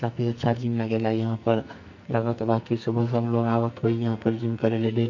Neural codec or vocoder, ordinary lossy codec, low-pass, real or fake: codec, 44.1 kHz, 2.6 kbps, SNAC; none; 7.2 kHz; fake